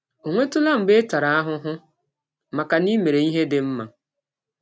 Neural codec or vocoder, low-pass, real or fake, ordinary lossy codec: none; none; real; none